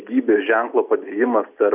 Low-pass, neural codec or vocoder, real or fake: 3.6 kHz; none; real